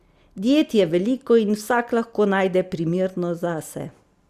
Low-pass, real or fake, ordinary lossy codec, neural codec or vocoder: 14.4 kHz; real; Opus, 64 kbps; none